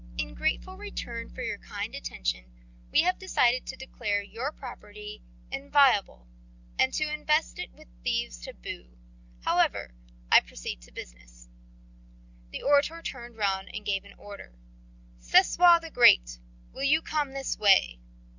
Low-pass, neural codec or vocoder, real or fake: 7.2 kHz; none; real